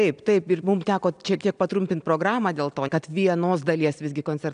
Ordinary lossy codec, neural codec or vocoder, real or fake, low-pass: MP3, 96 kbps; none; real; 9.9 kHz